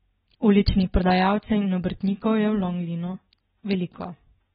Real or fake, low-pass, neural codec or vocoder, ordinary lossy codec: real; 10.8 kHz; none; AAC, 16 kbps